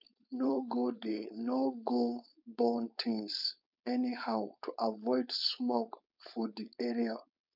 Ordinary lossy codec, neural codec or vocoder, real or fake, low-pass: none; codec, 16 kHz, 4.8 kbps, FACodec; fake; 5.4 kHz